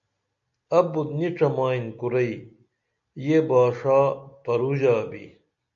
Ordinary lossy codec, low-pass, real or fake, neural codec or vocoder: AAC, 64 kbps; 7.2 kHz; real; none